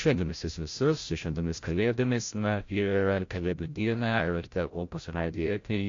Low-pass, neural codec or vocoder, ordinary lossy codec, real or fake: 7.2 kHz; codec, 16 kHz, 0.5 kbps, FreqCodec, larger model; AAC, 48 kbps; fake